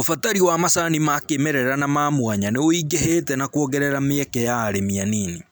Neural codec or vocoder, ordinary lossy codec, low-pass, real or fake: none; none; none; real